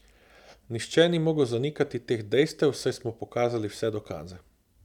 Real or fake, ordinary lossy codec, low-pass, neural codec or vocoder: real; none; 19.8 kHz; none